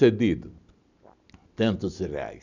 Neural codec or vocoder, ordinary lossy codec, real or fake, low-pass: none; none; real; 7.2 kHz